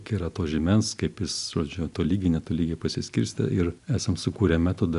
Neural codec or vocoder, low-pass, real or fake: none; 10.8 kHz; real